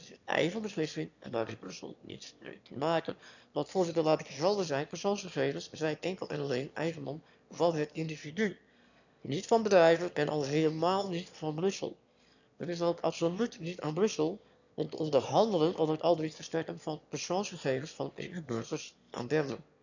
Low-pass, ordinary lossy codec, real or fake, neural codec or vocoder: 7.2 kHz; none; fake; autoencoder, 22.05 kHz, a latent of 192 numbers a frame, VITS, trained on one speaker